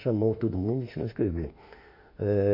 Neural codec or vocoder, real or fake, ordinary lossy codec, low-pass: autoencoder, 48 kHz, 32 numbers a frame, DAC-VAE, trained on Japanese speech; fake; MP3, 32 kbps; 5.4 kHz